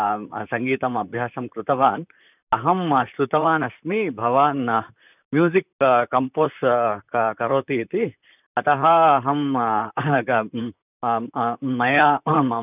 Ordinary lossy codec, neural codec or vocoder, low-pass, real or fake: none; vocoder, 44.1 kHz, 128 mel bands, Pupu-Vocoder; 3.6 kHz; fake